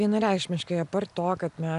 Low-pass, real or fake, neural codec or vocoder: 10.8 kHz; real; none